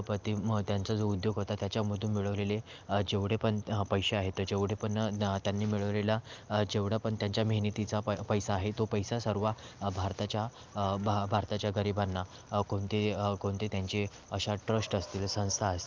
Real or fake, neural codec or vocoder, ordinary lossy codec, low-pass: real; none; Opus, 32 kbps; 7.2 kHz